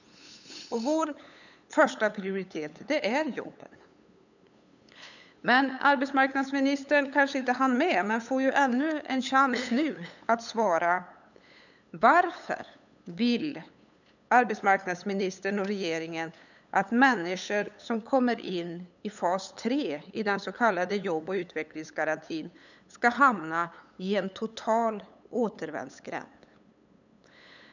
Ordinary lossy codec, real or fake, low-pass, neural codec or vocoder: none; fake; 7.2 kHz; codec, 16 kHz, 8 kbps, FunCodec, trained on LibriTTS, 25 frames a second